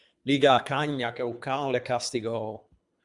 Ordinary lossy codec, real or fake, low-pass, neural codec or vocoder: MP3, 96 kbps; fake; 10.8 kHz; codec, 24 kHz, 3 kbps, HILCodec